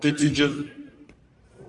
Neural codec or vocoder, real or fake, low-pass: codec, 44.1 kHz, 1.7 kbps, Pupu-Codec; fake; 10.8 kHz